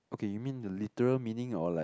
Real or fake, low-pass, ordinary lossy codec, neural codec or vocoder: real; none; none; none